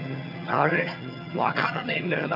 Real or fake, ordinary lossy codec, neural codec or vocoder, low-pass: fake; none; vocoder, 22.05 kHz, 80 mel bands, HiFi-GAN; 5.4 kHz